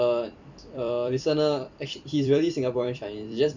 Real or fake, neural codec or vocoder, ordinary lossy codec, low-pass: fake; vocoder, 44.1 kHz, 128 mel bands every 256 samples, BigVGAN v2; none; 7.2 kHz